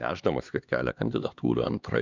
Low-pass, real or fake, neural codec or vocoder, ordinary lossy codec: 7.2 kHz; fake; codec, 16 kHz, 2 kbps, X-Codec, HuBERT features, trained on LibriSpeech; Opus, 64 kbps